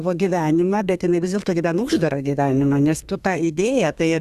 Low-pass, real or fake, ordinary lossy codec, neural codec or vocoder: 14.4 kHz; fake; Opus, 64 kbps; codec, 32 kHz, 1.9 kbps, SNAC